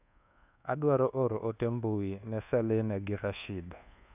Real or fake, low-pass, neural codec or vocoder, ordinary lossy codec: fake; 3.6 kHz; codec, 24 kHz, 1.2 kbps, DualCodec; none